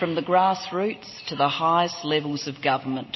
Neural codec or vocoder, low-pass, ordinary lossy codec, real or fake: none; 7.2 kHz; MP3, 24 kbps; real